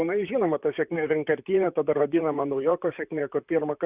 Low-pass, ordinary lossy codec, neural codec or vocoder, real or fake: 3.6 kHz; Opus, 64 kbps; vocoder, 44.1 kHz, 128 mel bands, Pupu-Vocoder; fake